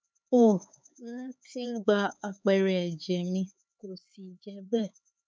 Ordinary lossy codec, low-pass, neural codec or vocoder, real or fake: none; none; codec, 16 kHz, 4 kbps, X-Codec, HuBERT features, trained on LibriSpeech; fake